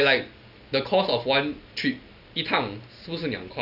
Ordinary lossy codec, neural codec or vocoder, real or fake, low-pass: none; none; real; 5.4 kHz